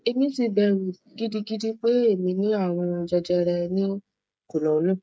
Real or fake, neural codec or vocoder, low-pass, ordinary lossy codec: fake; codec, 16 kHz, 16 kbps, FreqCodec, smaller model; none; none